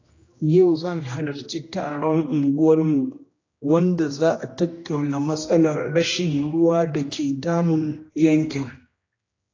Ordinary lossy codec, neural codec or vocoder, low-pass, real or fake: AAC, 32 kbps; codec, 16 kHz, 1 kbps, X-Codec, HuBERT features, trained on general audio; 7.2 kHz; fake